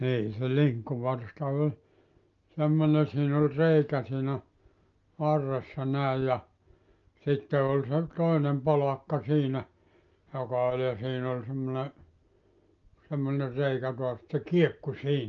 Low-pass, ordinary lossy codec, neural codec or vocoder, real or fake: 7.2 kHz; Opus, 24 kbps; none; real